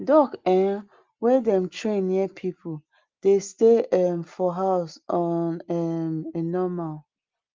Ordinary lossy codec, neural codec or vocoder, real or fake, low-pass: Opus, 32 kbps; none; real; 7.2 kHz